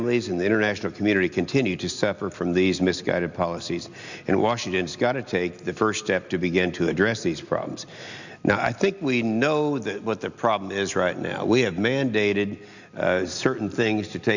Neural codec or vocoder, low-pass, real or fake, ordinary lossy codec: none; 7.2 kHz; real; Opus, 64 kbps